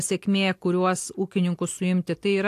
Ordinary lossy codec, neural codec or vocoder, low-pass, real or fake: AAC, 64 kbps; none; 14.4 kHz; real